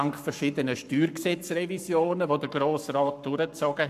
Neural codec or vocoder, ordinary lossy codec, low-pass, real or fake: codec, 44.1 kHz, 7.8 kbps, Pupu-Codec; none; 14.4 kHz; fake